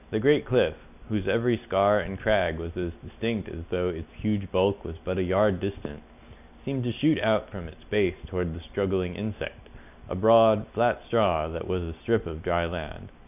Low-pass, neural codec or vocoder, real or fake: 3.6 kHz; none; real